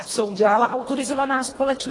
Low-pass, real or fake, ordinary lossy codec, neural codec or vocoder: 10.8 kHz; fake; AAC, 32 kbps; codec, 24 kHz, 1.5 kbps, HILCodec